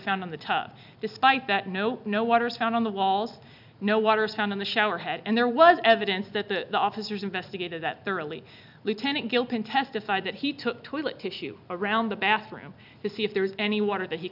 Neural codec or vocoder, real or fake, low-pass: none; real; 5.4 kHz